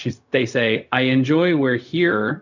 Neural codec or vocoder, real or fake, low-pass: codec, 16 kHz, 0.4 kbps, LongCat-Audio-Codec; fake; 7.2 kHz